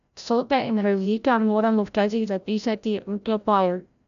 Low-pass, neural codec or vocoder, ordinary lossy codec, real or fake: 7.2 kHz; codec, 16 kHz, 0.5 kbps, FreqCodec, larger model; none; fake